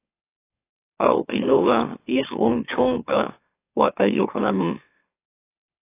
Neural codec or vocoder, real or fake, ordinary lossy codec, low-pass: autoencoder, 44.1 kHz, a latent of 192 numbers a frame, MeloTTS; fake; AAC, 24 kbps; 3.6 kHz